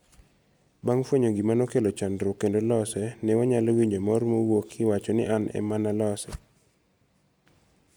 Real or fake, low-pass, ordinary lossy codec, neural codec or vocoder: real; none; none; none